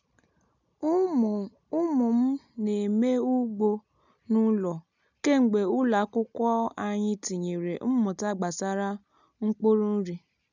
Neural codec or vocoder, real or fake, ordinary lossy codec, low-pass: none; real; none; 7.2 kHz